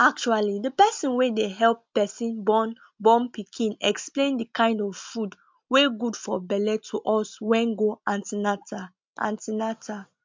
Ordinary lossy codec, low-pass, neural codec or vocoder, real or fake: MP3, 64 kbps; 7.2 kHz; none; real